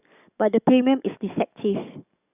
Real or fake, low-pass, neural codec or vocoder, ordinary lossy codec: fake; 3.6 kHz; codec, 44.1 kHz, 7.8 kbps, DAC; none